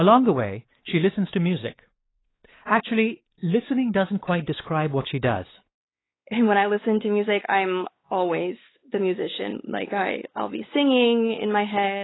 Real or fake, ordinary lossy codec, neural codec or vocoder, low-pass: fake; AAC, 16 kbps; codec, 16 kHz, 4 kbps, X-Codec, WavLM features, trained on Multilingual LibriSpeech; 7.2 kHz